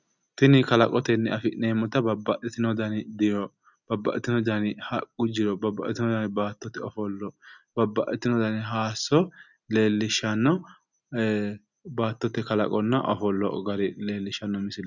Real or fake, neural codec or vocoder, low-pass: real; none; 7.2 kHz